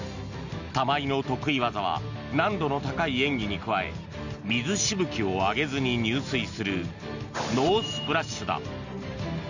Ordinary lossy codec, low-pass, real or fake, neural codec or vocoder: Opus, 64 kbps; 7.2 kHz; real; none